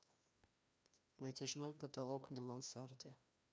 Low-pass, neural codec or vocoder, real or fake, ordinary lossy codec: none; codec, 16 kHz, 1 kbps, FreqCodec, larger model; fake; none